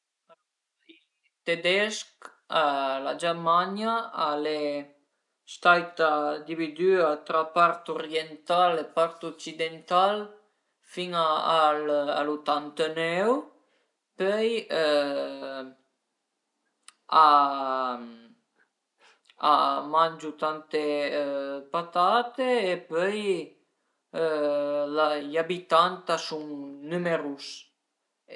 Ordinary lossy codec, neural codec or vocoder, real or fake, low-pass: none; none; real; 10.8 kHz